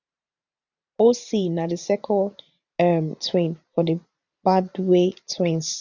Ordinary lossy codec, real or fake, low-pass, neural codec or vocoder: none; fake; 7.2 kHz; vocoder, 44.1 kHz, 128 mel bands every 512 samples, BigVGAN v2